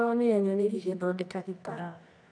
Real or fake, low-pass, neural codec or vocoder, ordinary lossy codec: fake; 9.9 kHz; codec, 24 kHz, 0.9 kbps, WavTokenizer, medium music audio release; none